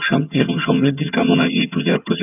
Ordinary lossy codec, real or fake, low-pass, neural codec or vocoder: none; fake; 3.6 kHz; vocoder, 22.05 kHz, 80 mel bands, HiFi-GAN